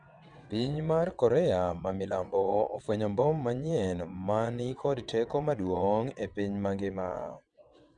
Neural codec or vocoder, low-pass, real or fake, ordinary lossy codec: vocoder, 22.05 kHz, 80 mel bands, WaveNeXt; 9.9 kHz; fake; none